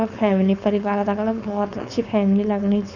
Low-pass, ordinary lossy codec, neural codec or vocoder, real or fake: 7.2 kHz; none; codec, 16 kHz, 4.8 kbps, FACodec; fake